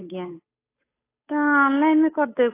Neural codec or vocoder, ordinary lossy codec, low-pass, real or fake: codec, 16 kHz, 8 kbps, FunCodec, trained on Chinese and English, 25 frames a second; AAC, 16 kbps; 3.6 kHz; fake